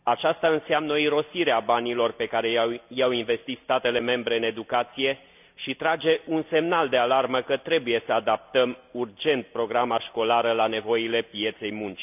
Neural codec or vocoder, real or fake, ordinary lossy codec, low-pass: none; real; none; 3.6 kHz